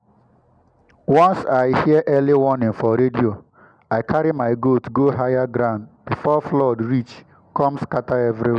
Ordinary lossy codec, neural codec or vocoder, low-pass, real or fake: none; none; 9.9 kHz; real